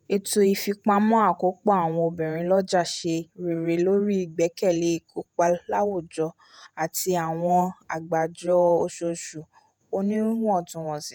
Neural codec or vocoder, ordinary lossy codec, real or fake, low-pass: vocoder, 48 kHz, 128 mel bands, Vocos; none; fake; 19.8 kHz